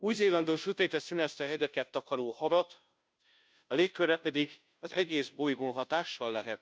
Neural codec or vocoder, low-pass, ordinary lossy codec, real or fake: codec, 16 kHz, 0.5 kbps, FunCodec, trained on Chinese and English, 25 frames a second; none; none; fake